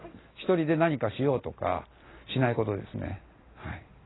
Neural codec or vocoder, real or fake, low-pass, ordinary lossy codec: none; real; 7.2 kHz; AAC, 16 kbps